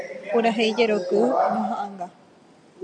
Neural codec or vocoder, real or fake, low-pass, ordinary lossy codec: none; real; 9.9 kHz; MP3, 64 kbps